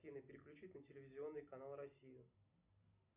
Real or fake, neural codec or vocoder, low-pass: real; none; 3.6 kHz